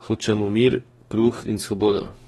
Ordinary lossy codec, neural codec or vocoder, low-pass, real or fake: AAC, 32 kbps; codec, 44.1 kHz, 2.6 kbps, DAC; 19.8 kHz; fake